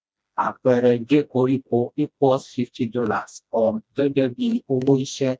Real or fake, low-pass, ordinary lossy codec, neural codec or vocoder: fake; none; none; codec, 16 kHz, 1 kbps, FreqCodec, smaller model